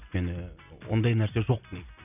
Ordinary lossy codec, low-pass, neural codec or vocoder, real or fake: none; 3.6 kHz; none; real